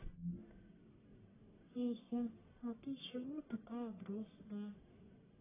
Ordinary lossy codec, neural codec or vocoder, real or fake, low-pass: AAC, 16 kbps; codec, 44.1 kHz, 1.7 kbps, Pupu-Codec; fake; 3.6 kHz